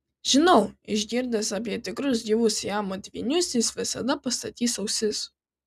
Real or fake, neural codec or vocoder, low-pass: real; none; 14.4 kHz